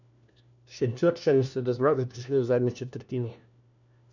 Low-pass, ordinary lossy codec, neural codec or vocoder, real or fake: 7.2 kHz; none; codec, 16 kHz, 1 kbps, FunCodec, trained on LibriTTS, 50 frames a second; fake